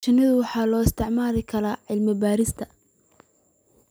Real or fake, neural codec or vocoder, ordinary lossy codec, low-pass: real; none; none; none